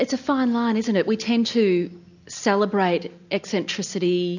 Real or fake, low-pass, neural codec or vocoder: real; 7.2 kHz; none